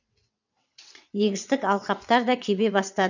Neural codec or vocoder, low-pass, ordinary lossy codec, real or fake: vocoder, 22.05 kHz, 80 mel bands, WaveNeXt; 7.2 kHz; none; fake